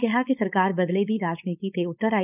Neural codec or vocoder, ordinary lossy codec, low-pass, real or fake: codec, 16 kHz, 4.8 kbps, FACodec; none; 3.6 kHz; fake